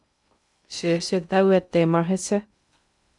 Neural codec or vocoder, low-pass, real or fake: codec, 16 kHz in and 24 kHz out, 0.6 kbps, FocalCodec, streaming, 2048 codes; 10.8 kHz; fake